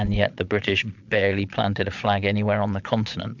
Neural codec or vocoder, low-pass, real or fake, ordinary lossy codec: vocoder, 22.05 kHz, 80 mel bands, Vocos; 7.2 kHz; fake; MP3, 64 kbps